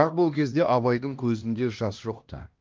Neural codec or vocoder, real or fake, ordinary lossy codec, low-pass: codec, 16 kHz, 1 kbps, X-Codec, HuBERT features, trained on LibriSpeech; fake; Opus, 32 kbps; 7.2 kHz